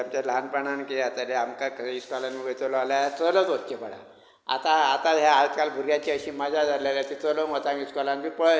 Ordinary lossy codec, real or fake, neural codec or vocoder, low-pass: none; real; none; none